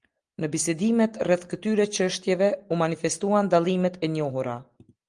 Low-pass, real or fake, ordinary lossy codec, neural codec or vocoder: 9.9 kHz; real; Opus, 24 kbps; none